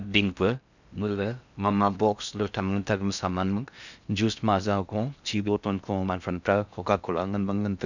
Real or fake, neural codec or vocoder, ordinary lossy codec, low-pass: fake; codec, 16 kHz in and 24 kHz out, 0.6 kbps, FocalCodec, streaming, 4096 codes; none; 7.2 kHz